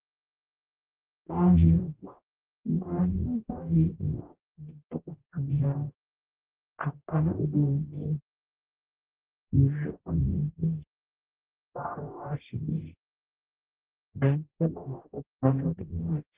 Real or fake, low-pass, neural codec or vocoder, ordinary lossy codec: fake; 3.6 kHz; codec, 44.1 kHz, 0.9 kbps, DAC; Opus, 16 kbps